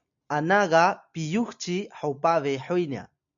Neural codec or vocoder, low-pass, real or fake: none; 7.2 kHz; real